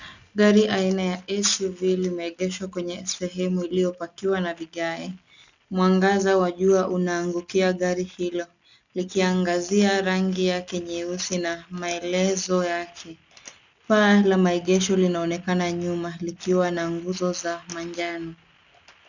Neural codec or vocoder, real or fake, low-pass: none; real; 7.2 kHz